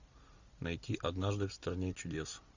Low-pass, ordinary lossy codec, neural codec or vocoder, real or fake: 7.2 kHz; Opus, 64 kbps; none; real